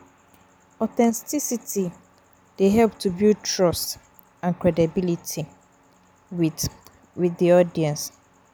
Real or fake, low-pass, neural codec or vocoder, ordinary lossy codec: real; none; none; none